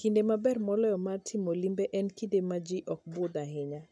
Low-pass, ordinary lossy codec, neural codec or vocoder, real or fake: none; none; none; real